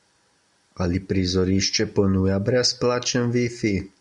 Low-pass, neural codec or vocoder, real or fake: 10.8 kHz; none; real